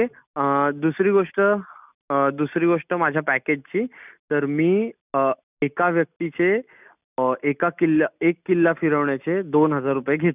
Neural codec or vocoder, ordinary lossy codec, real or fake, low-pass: none; none; real; 3.6 kHz